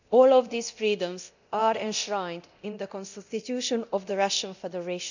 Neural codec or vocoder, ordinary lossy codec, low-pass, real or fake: codec, 24 kHz, 0.9 kbps, DualCodec; none; 7.2 kHz; fake